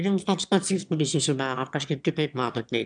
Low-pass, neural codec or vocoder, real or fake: 9.9 kHz; autoencoder, 22.05 kHz, a latent of 192 numbers a frame, VITS, trained on one speaker; fake